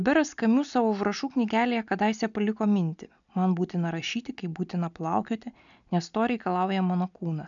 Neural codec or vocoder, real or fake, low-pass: none; real; 7.2 kHz